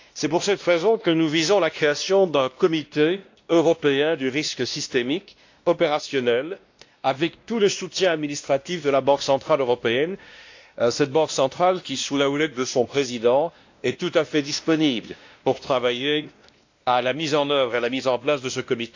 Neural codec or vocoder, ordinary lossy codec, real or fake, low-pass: codec, 16 kHz, 1 kbps, X-Codec, WavLM features, trained on Multilingual LibriSpeech; AAC, 48 kbps; fake; 7.2 kHz